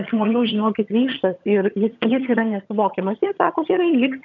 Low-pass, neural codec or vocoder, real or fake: 7.2 kHz; vocoder, 22.05 kHz, 80 mel bands, HiFi-GAN; fake